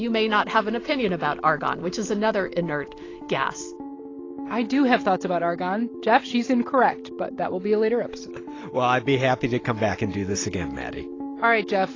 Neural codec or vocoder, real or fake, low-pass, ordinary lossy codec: none; real; 7.2 kHz; AAC, 32 kbps